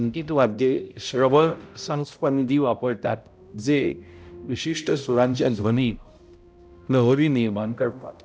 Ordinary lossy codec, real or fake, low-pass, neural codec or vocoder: none; fake; none; codec, 16 kHz, 0.5 kbps, X-Codec, HuBERT features, trained on balanced general audio